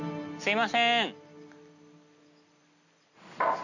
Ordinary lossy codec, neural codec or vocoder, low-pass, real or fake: none; none; 7.2 kHz; real